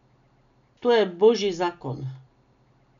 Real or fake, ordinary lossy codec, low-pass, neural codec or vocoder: real; none; 7.2 kHz; none